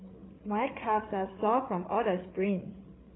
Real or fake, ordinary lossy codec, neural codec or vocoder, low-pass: fake; AAC, 16 kbps; codec, 16 kHz, 8 kbps, FreqCodec, larger model; 7.2 kHz